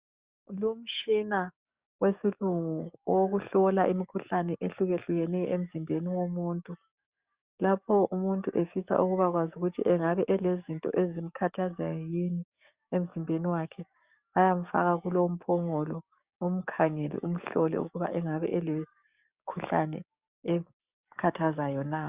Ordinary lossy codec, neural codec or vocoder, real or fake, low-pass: Opus, 64 kbps; codec, 44.1 kHz, 7.8 kbps, DAC; fake; 3.6 kHz